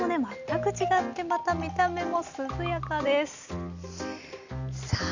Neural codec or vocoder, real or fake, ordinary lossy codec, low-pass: none; real; none; 7.2 kHz